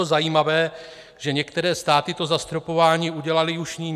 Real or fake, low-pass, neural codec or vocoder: real; 14.4 kHz; none